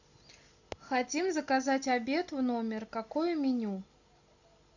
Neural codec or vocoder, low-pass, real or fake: none; 7.2 kHz; real